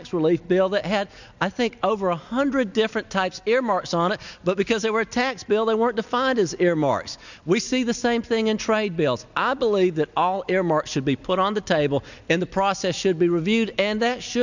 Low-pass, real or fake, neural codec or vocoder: 7.2 kHz; real; none